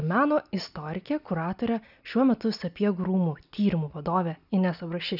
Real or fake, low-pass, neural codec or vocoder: real; 5.4 kHz; none